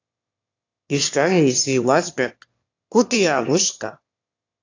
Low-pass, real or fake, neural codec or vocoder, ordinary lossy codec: 7.2 kHz; fake; autoencoder, 22.05 kHz, a latent of 192 numbers a frame, VITS, trained on one speaker; AAC, 48 kbps